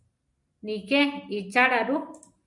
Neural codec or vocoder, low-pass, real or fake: none; 10.8 kHz; real